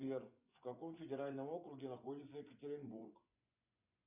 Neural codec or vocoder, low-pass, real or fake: vocoder, 24 kHz, 100 mel bands, Vocos; 3.6 kHz; fake